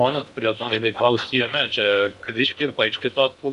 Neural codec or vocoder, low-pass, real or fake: codec, 16 kHz in and 24 kHz out, 0.8 kbps, FocalCodec, streaming, 65536 codes; 10.8 kHz; fake